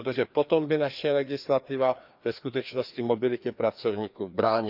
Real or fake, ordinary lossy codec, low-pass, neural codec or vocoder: fake; Opus, 64 kbps; 5.4 kHz; codec, 16 kHz, 2 kbps, FreqCodec, larger model